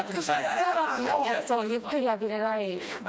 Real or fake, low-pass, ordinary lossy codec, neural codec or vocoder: fake; none; none; codec, 16 kHz, 1 kbps, FreqCodec, smaller model